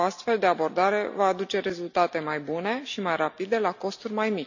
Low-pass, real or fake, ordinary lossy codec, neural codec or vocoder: 7.2 kHz; real; none; none